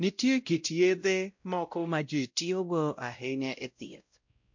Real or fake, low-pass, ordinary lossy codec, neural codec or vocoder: fake; 7.2 kHz; MP3, 48 kbps; codec, 16 kHz, 0.5 kbps, X-Codec, WavLM features, trained on Multilingual LibriSpeech